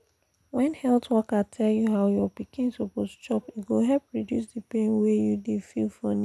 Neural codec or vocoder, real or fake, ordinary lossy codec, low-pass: none; real; none; none